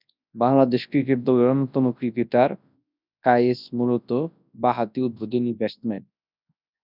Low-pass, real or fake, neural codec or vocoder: 5.4 kHz; fake; codec, 24 kHz, 0.9 kbps, WavTokenizer, large speech release